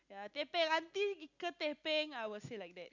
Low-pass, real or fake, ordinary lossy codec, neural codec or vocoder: 7.2 kHz; real; none; none